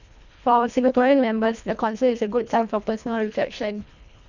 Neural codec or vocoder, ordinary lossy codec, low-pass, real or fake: codec, 24 kHz, 1.5 kbps, HILCodec; none; 7.2 kHz; fake